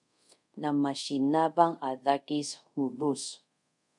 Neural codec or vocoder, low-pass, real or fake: codec, 24 kHz, 0.5 kbps, DualCodec; 10.8 kHz; fake